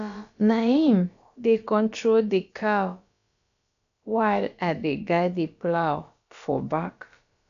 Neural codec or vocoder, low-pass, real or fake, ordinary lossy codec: codec, 16 kHz, about 1 kbps, DyCAST, with the encoder's durations; 7.2 kHz; fake; none